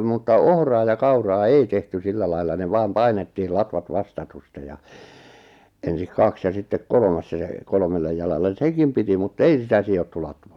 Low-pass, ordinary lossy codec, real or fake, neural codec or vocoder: 19.8 kHz; none; real; none